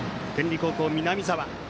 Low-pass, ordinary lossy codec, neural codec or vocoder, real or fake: none; none; none; real